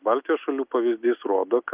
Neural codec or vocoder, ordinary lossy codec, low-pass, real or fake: none; Opus, 24 kbps; 3.6 kHz; real